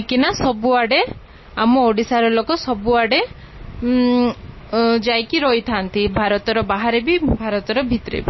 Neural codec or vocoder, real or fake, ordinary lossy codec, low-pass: none; real; MP3, 24 kbps; 7.2 kHz